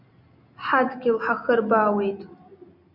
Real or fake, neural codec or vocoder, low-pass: real; none; 5.4 kHz